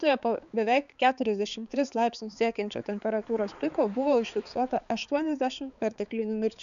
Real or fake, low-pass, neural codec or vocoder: fake; 7.2 kHz; codec, 16 kHz, 4 kbps, X-Codec, HuBERT features, trained on balanced general audio